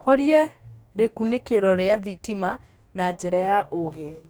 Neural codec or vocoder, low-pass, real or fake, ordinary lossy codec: codec, 44.1 kHz, 2.6 kbps, DAC; none; fake; none